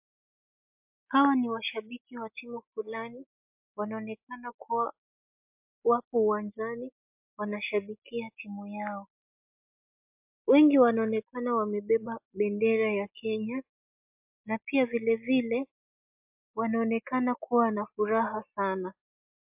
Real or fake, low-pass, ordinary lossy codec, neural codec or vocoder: real; 3.6 kHz; MP3, 32 kbps; none